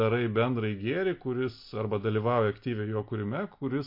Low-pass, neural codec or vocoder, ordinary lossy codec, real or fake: 5.4 kHz; none; MP3, 32 kbps; real